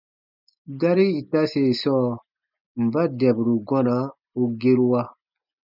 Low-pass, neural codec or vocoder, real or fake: 5.4 kHz; none; real